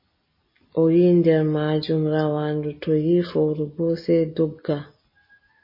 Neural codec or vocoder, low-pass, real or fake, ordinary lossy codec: none; 5.4 kHz; real; MP3, 24 kbps